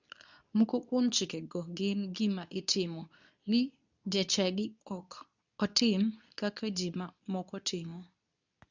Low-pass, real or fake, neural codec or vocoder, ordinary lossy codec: 7.2 kHz; fake; codec, 24 kHz, 0.9 kbps, WavTokenizer, medium speech release version 2; none